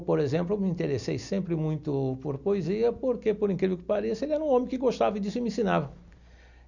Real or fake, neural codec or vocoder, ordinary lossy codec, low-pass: real; none; none; 7.2 kHz